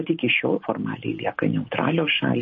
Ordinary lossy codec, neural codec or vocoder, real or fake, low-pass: MP3, 32 kbps; none; real; 7.2 kHz